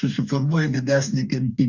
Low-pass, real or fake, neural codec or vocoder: 7.2 kHz; fake; codec, 44.1 kHz, 2.6 kbps, DAC